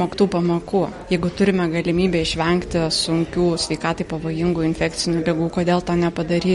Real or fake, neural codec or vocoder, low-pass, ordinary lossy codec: real; none; 19.8 kHz; MP3, 48 kbps